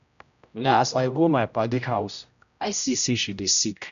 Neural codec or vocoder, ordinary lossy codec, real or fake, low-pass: codec, 16 kHz, 0.5 kbps, X-Codec, HuBERT features, trained on general audio; none; fake; 7.2 kHz